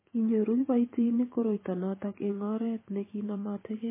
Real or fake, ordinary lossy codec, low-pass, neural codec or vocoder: fake; MP3, 16 kbps; 3.6 kHz; vocoder, 44.1 kHz, 128 mel bands every 512 samples, BigVGAN v2